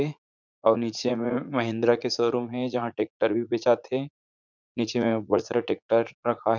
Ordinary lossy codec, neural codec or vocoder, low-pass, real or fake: none; vocoder, 44.1 kHz, 80 mel bands, Vocos; 7.2 kHz; fake